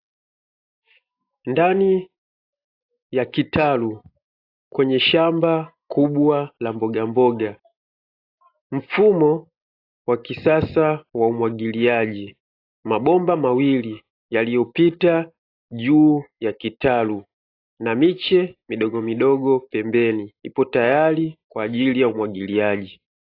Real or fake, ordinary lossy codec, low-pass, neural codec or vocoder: real; AAC, 32 kbps; 5.4 kHz; none